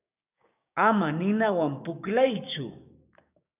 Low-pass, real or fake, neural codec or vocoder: 3.6 kHz; fake; codec, 16 kHz, 6 kbps, DAC